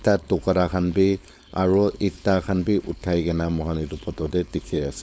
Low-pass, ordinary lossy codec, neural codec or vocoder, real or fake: none; none; codec, 16 kHz, 4.8 kbps, FACodec; fake